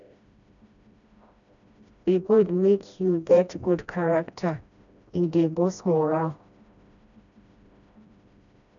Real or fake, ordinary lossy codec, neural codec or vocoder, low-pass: fake; none; codec, 16 kHz, 1 kbps, FreqCodec, smaller model; 7.2 kHz